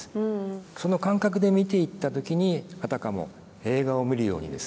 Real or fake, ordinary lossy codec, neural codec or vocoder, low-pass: real; none; none; none